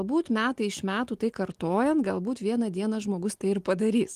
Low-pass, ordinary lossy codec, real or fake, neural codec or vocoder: 14.4 kHz; Opus, 24 kbps; real; none